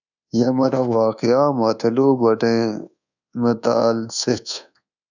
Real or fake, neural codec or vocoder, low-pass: fake; codec, 24 kHz, 1.2 kbps, DualCodec; 7.2 kHz